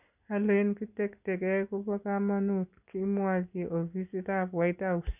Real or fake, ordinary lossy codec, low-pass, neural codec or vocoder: real; none; 3.6 kHz; none